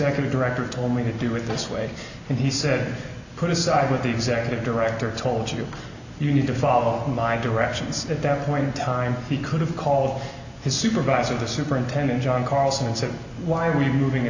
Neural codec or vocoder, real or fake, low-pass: none; real; 7.2 kHz